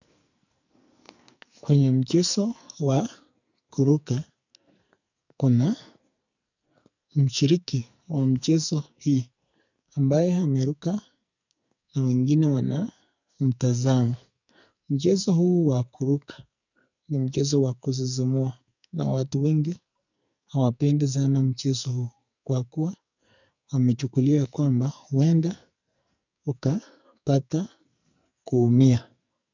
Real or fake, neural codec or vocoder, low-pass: fake; codec, 44.1 kHz, 2.6 kbps, SNAC; 7.2 kHz